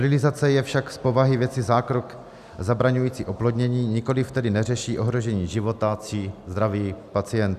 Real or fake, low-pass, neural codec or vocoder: real; 14.4 kHz; none